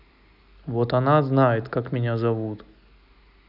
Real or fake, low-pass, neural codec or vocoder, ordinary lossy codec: real; 5.4 kHz; none; none